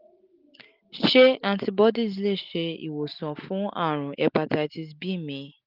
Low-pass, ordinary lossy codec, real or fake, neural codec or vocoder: 5.4 kHz; Opus, 32 kbps; real; none